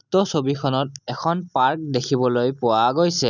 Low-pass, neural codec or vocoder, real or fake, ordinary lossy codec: 7.2 kHz; none; real; none